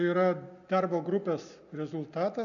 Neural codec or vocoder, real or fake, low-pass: none; real; 7.2 kHz